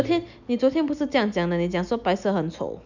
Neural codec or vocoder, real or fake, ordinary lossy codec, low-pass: none; real; none; 7.2 kHz